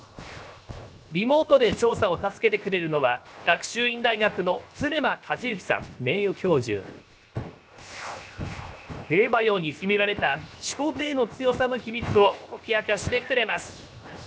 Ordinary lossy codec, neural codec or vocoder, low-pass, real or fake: none; codec, 16 kHz, 0.7 kbps, FocalCodec; none; fake